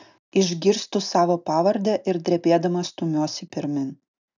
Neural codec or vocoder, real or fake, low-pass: none; real; 7.2 kHz